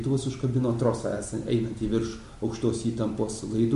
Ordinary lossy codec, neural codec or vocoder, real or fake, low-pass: MP3, 48 kbps; none; real; 14.4 kHz